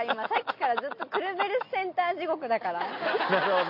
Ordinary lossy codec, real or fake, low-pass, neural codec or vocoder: MP3, 32 kbps; real; 5.4 kHz; none